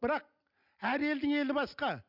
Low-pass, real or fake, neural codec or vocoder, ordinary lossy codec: 5.4 kHz; real; none; none